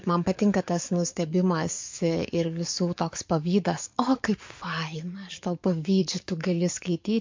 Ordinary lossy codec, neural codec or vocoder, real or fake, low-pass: MP3, 48 kbps; codec, 44.1 kHz, 7.8 kbps, DAC; fake; 7.2 kHz